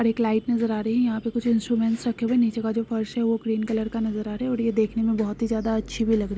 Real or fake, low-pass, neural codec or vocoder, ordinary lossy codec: real; none; none; none